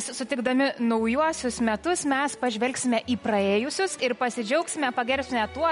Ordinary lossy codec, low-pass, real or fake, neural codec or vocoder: MP3, 48 kbps; 10.8 kHz; real; none